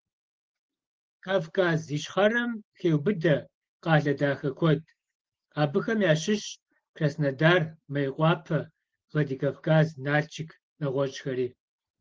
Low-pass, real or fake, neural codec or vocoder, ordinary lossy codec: 7.2 kHz; real; none; Opus, 16 kbps